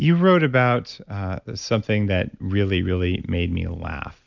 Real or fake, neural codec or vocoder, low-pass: real; none; 7.2 kHz